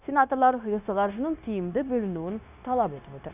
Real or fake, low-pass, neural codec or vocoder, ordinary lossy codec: fake; 3.6 kHz; codec, 16 kHz, 0.9 kbps, LongCat-Audio-Codec; none